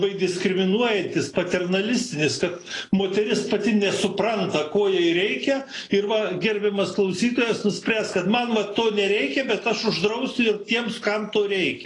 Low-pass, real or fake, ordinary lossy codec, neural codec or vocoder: 10.8 kHz; real; AAC, 32 kbps; none